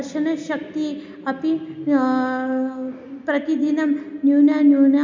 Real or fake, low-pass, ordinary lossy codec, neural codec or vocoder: real; 7.2 kHz; MP3, 64 kbps; none